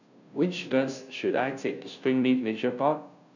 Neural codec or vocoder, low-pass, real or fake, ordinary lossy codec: codec, 16 kHz, 0.5 kbps, FunCodec, trained on Chinese and English, 25 frames a second; 7.2 kHz; fake; none